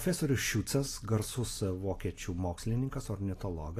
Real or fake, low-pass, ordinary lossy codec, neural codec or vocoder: real; 14.4 kHz; AAC, 48 kbps; none